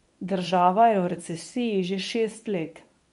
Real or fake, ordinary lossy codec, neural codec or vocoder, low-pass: fake; none; codec, 24 kHz, 0.9 kbps, WavTokenizer, medium speech release version 1; 10.8 kHz